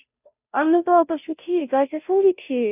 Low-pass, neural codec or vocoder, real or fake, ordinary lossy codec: 3.6 kHz; codec, 16 kHz, 0.5 kbps, FunCodec, trained on Chinese and English, 25 frames a second; fake; none